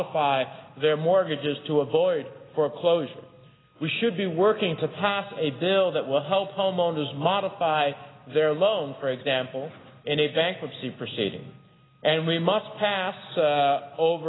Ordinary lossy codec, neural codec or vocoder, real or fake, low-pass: AAC, 16 kbps; none; real; 7.2 kHz